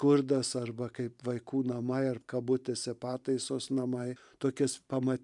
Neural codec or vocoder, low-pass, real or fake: vocoder, 44.1 kHz, 128 mel bands every 512 samples, BigVGAN v2; 10.8 kHz; fake